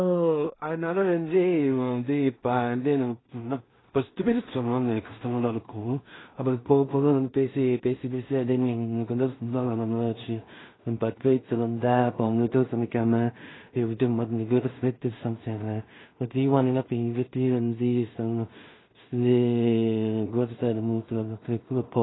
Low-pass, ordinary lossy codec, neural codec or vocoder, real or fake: 7.2 kHz; AAC, 16 kbps; codec, 16 kHz in and 24 kHz out, 0.4 kbps, LongCat-Audio-Codec, two codebook decoder; fake